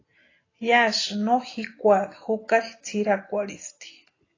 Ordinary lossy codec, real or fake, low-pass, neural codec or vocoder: AAC, 32 kbps; real; 7.2 kHz; none